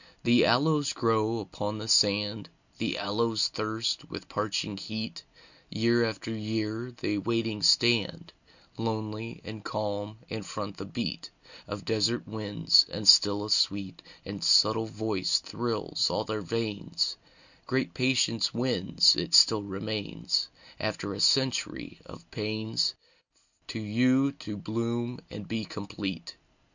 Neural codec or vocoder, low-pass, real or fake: none; 7.2 kHz; real